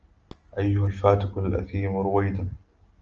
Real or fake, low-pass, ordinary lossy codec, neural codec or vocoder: real; 7.2 kHz; Opus, 24 kbps; none